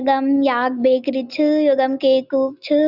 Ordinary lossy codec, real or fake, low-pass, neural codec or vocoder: Opus, 64 kbps; real; 5.4 kHz; none